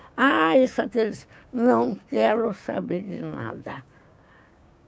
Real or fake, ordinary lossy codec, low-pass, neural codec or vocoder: fake; none; none; codec, 16 kHz, 6 kbps, DAC